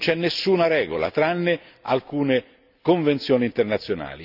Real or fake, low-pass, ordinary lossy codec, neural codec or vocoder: real; 5.4 kHz; none; none